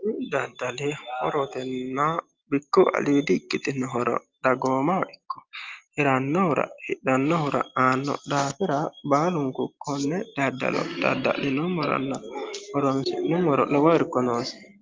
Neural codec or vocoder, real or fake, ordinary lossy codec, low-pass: none; real; Opus, 24 kbps; 7.2 kHz